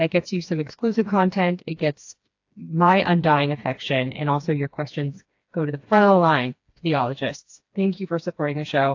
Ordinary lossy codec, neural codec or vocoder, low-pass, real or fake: AAC, 48 kbps; codec, 16 kHz, 2 kbps, FreqCodec, smaller model; 7.2 kHz; fake